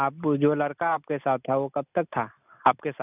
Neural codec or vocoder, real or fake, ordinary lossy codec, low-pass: none; real; none; 3.6 kHz